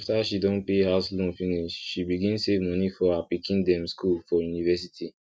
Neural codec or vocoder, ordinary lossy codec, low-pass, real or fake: none; none; none; real